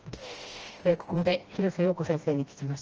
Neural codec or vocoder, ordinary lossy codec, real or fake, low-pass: codec, 16 kHz, 1 kbps, FreqCodec, smaller model; Opus, 24 kbps; fake; 7.2 kHz